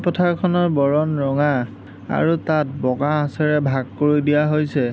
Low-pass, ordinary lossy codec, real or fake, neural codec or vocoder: none; none; real; none